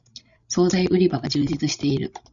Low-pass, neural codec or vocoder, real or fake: 7.2 kHz; codec, 16 kHz, 16 kbps, FreqCodec, larger model; fake